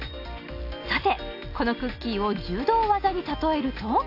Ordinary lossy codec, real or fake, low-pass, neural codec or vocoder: AAC, 32 kbps; real; 5.4 kHz; none